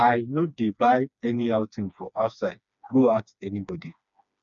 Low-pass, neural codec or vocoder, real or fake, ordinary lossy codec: 7.2 kHz; codec, 16 kHz, 2 kbps, FreqCodec, smaller model; fake; none